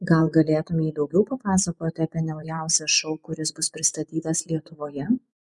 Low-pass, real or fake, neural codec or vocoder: 10.8 kHz; fake; vocoder, 24 kHz, 100 mel bands, Vocos